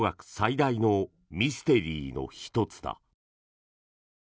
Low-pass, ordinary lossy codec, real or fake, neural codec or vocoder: none; none; real; none